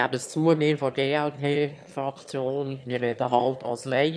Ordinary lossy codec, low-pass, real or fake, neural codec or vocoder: none; none; fake; autoencoder, 22.05 kHz, a latent of 192 numbers a frame, VITS, trained on one speaker